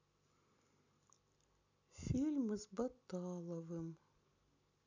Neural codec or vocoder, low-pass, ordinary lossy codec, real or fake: none; 7.2 kHz; none; real